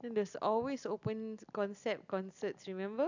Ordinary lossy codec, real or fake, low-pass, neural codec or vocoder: none; real; 7.2 kHz; none